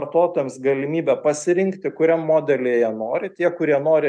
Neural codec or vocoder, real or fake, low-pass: none; real; 9.9 kHz